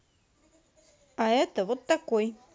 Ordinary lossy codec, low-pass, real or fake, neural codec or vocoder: none; none; real; none